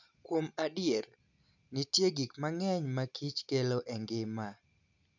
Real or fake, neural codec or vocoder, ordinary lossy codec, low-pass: real; none; none; 7.2 kHz